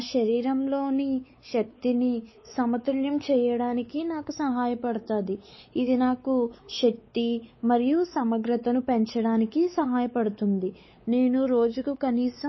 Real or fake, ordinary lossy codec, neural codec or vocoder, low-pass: fake; MP3, 24 kbps; codec, 16 kHz, 4 kbps, X-Codec, WavLM features, trained on Multilingual LibriSpeech; 7.2 kHz